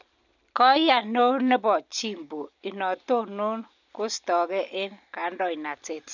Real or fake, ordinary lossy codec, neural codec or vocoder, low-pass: real; none; none; 7.2 kHz